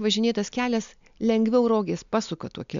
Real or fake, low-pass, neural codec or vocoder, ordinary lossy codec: real; 7.2 kHz; none; MP3, 64 kbps